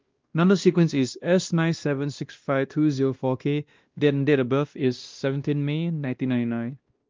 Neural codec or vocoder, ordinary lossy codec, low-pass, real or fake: codec, 16 kHz, 1 kbps, X-Codec, WavLM features, trained on Multilingual LibriSpeech; Opus, 32 kbps; 7.2 kHz; fake